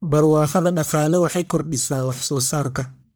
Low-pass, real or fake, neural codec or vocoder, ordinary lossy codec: none; fake; codec, 44.1 kHz, 1.7 kbps, Pupu-Codec; none